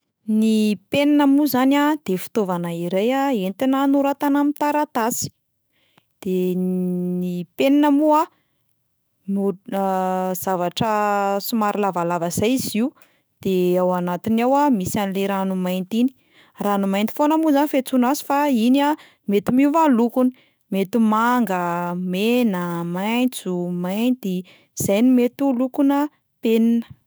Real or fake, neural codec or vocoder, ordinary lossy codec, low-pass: fake; autoencoder, 48 kHz, 128 numbers a frame, DAC-VAE, trained on Japanese speech; none; none